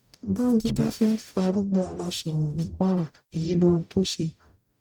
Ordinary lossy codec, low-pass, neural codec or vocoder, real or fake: none; 19.8 kHz; codec, 44.1 kHz, 0.9 kbps, DAC; fake